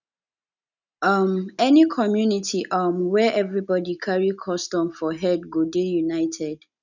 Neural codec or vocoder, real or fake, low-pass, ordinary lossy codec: none; real; 7.2 kHz; none